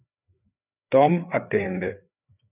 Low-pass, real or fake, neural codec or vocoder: 3.6 kHz; fake; codec, 16 kHz, 4 kbps, FreqCodec, larger model